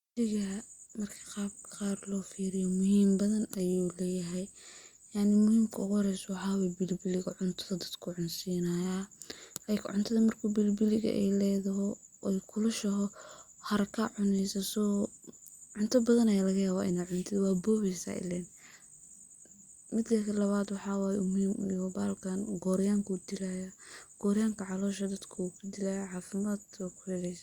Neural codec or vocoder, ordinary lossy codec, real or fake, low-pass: none; Opus, 64 kbps; real; 19.8 kHz